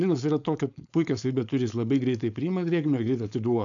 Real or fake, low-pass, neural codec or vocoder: fake; 7.2 kHz; codec, 16 kHz, 4.8 kbps, FACodec